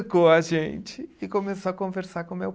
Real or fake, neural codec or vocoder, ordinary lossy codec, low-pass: real; none; none; none